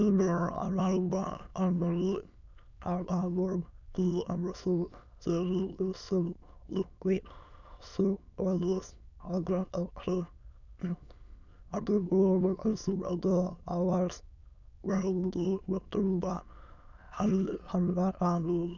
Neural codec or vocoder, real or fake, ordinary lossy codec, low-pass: autoencoder, 22.05 kHz, a latent of 192 numbers a frame, VITS, trained on many speakers; fake; none; 7.2 kHz